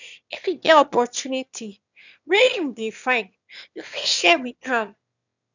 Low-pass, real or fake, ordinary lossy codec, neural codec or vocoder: 7.2 kHz; fake; none; autoencoder, 22.05 kHz, a latent of 192 numbers a frame, VITS, trained on one speaker